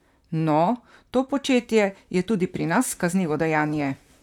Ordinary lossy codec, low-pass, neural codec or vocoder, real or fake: none; 19.8 kHz; vocoder, 44.1 kHz, 128 mel bands, Pupu-Vocoder; fake